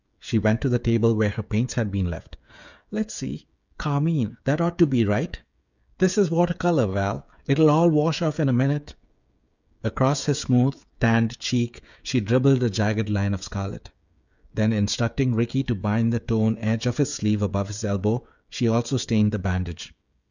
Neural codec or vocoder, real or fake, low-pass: codec, 16 kHz, 16 kbps, FreqCodec, smaller model; fake; 7.2 kHz